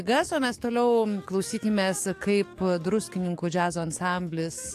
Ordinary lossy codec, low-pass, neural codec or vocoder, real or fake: AAC, 64 kbps; 14.4 kHz; codec, 44.1 kHz, 7.8 kbps, DAC; fake